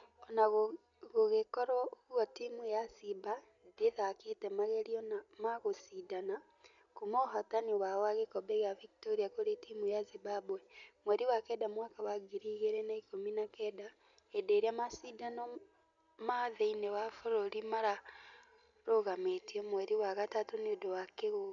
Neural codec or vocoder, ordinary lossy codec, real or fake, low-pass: none; MP3, 96 kbps; real; 7.2 kHz